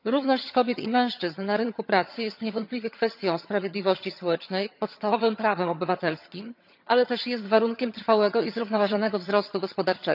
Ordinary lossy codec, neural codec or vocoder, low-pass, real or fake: none; vocoder, 22.05 kHz, 80 mel bands, HiFi-GAN; 5.4 kHz; fake